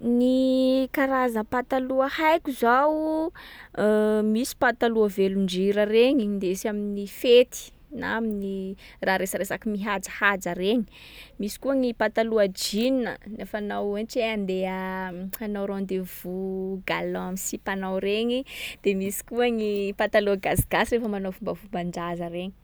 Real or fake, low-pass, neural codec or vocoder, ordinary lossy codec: real; none; none; none